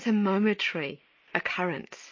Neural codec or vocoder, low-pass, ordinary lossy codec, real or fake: none; 7.2 kHz; MP3, 32 kbps; real